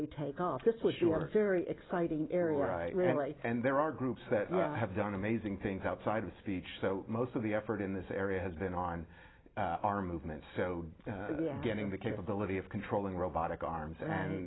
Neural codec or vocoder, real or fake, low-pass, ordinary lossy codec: none; real; 7.2 kHz; AAC, 16 kbps